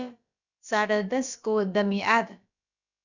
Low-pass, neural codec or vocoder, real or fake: 7.2 kHz; codec, 16 kHz, about 1 kbps, DyCAST, with the encoder's durations; fake